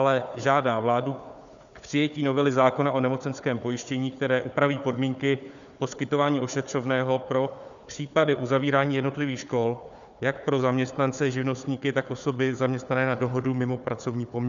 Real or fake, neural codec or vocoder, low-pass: fake; codec, 16 kHz, 4 kbps, FunCodec, trained on Chinese and English, 50 frames a second; 7.2 kHz